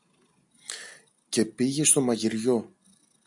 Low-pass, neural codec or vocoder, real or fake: 10.8 kHz; none; real